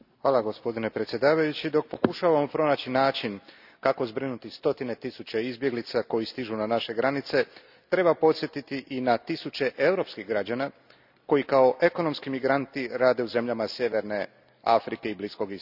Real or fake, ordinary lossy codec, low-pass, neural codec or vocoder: real; none; 5.4 kHz; none